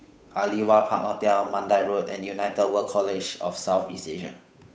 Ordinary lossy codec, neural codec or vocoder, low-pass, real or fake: none; codec, 16 kHz, 8 kbps, FunCodec, trained on Chinese and English, 25 frames a second; none; fake